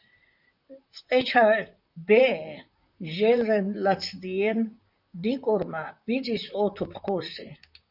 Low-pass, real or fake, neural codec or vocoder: 5.4 kHz; fake; vocoder, 44.1 kHz, 128 mel bands, Pupu-Vocoder